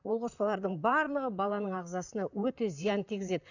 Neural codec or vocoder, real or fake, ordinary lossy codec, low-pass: vocoder, 44.1 kHz, 128 mel bands, Pupu-Vocoder; fake; none; 7.2 kHz